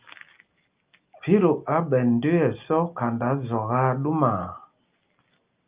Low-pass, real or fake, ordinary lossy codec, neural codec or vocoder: 3.6 kHz; real; Opus, 24 kbps; none